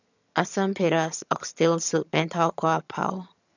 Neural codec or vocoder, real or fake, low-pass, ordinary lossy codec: vocoder, 22.05 kHz, 80 mel bands, HiFi-GAN; fake; 7.2 kHz; none